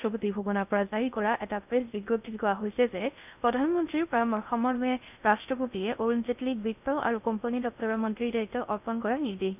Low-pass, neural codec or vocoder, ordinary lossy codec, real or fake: 3.6 kHz; codec, 16 kHz in and 24 kHz out, 0.6 kbps, FocalCodec, streaming, 2048 codes; none; fake